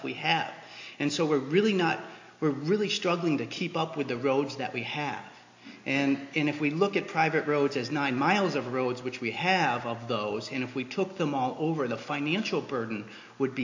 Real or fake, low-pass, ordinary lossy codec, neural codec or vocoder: real; 7.2 kHz; AAC, 48 kbps; none